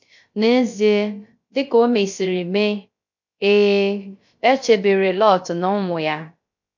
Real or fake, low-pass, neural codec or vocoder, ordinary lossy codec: fake; 7.2 kHz; codec, 16 kHz, 0.3 kbps, FocalCodec; MP3, 48 kbps